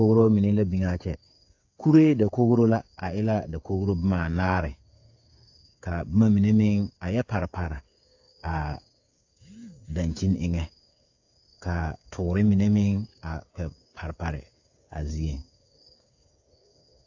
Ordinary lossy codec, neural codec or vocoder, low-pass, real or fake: AAC, 48 kbps; codec, 16 kHz, 8 kbps, FreqCodec, smaller model; 7.2 kHz; fake